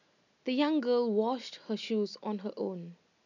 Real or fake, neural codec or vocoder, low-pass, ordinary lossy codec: real; none; 7.2 kHz; none